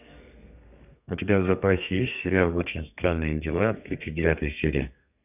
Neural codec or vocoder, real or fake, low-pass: codec, 32 kHz, 1.9 kbps, SNAC; fake; 3.6 kHz